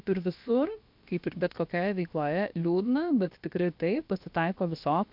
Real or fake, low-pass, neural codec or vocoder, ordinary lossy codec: fake; 5.4 kHz; codec, 16 kHz, about 1 kbps, DyCAST, with the encoder's durations; MP3, 48 kbps